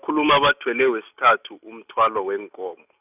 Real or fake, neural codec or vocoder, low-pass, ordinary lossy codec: real; none; 3.6 kHz; none